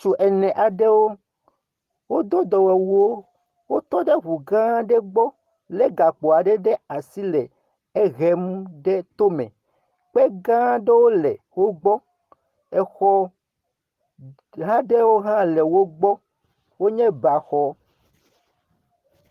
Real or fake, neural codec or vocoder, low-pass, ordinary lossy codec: real; none; 14.4 kHz; Opus, 24 kbps